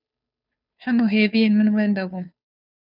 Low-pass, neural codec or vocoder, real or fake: 5.4 kHz; codec, 16 kHz, 2 kbps, FunCodec, trained on Chinese and English, 25 frames a second; fake